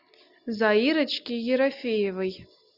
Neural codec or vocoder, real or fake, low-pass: none; real; 5.4 kHz